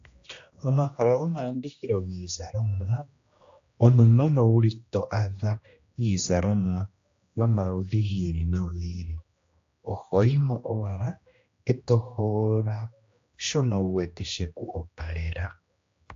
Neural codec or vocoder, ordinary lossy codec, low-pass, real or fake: codec, 16 kHz, 1 kbps, X-Codec, HuBERT features, trained on general audio; AAC, 48 kbps; 7.2 kHz; fake